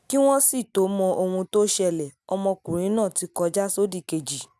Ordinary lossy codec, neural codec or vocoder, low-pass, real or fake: none; none; none; real